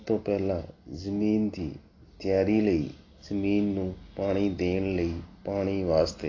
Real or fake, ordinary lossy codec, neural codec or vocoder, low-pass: real; AAC, 32 kbps; none; 7.2 kHz